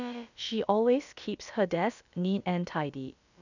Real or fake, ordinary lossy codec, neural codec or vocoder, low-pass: fake; none; codec, 16 kHz, about 1 kbps, DyCAST, with the encoder's durations; 7.2 kHz